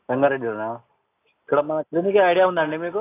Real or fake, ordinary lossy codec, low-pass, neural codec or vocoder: real; AAC, 24 kbps; 3.6 kHz; none